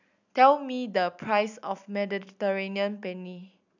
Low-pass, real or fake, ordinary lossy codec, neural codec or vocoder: 7.2 kHz; real; none; none